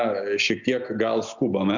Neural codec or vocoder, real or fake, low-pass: none; real; 7.2 kHz